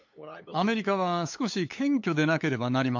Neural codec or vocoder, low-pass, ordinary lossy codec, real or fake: codec, 16 kHz, 8 kbps, FunCodec, trained on LibriTTS, 25 frames a second; 7.2 kHz; MP3, 48 kbps; fake